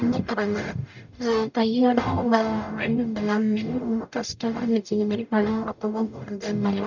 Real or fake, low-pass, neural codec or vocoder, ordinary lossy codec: fake; 7.2 kHz; codec, 44.1 kHz, 0.9 kbps, DAC; none